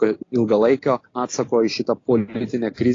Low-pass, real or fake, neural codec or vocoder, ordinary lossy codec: 7.2 kHz; real; none; AAC, 32 kbps